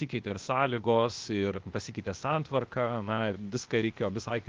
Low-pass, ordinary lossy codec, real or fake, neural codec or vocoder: 7.2 kHz; Opus, 32 kbps; fake; codec, 16 kHz, 0.8 kbps, ZipCodec